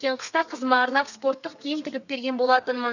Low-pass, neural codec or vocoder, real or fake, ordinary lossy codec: 7.2 kHz; codec, 32 kHz, 1.9 kbps, SNAC; fake; none